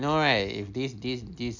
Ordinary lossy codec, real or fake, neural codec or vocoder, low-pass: none; real; none; 7.2 kHz